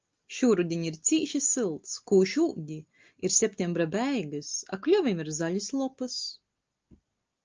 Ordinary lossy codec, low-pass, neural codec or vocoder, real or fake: Opus, 24 kbps; 7.2 kHz; none; real